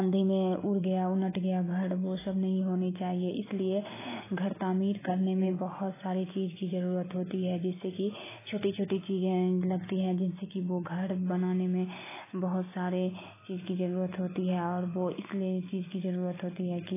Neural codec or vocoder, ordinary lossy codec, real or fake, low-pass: none; AAC, 16 kbps; real; 3.6 kHz